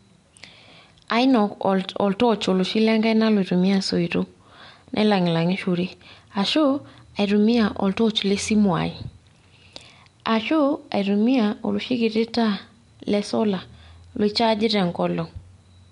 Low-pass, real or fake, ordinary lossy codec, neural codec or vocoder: 10.8 kHz; real; MP3, 64 kbps; none